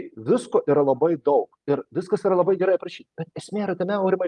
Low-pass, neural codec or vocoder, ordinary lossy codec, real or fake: 10.8 kHz; none; Opus, 32 kbps; real